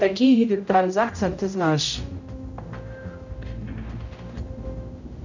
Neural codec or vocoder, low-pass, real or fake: codec, 16 kHz, 0.5 kbps, X-Codec, HuBERT features, trained on general audio; 7.2 kHz; fake